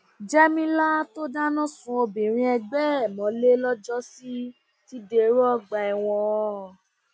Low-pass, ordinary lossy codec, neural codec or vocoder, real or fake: none; none; none; real